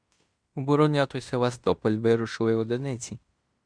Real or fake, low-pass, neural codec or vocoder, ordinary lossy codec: fake; 9.9 kHz; codec, 16 kHz in and 24 kHz out, 0.9 kbps, LongCat-Audio-Codec, fine tuned four codebook decoder; Opus, 64 kbps